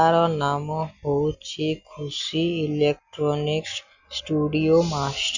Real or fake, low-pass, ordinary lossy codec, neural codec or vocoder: real; 7.2 kHz; Opus, 64 kbps; none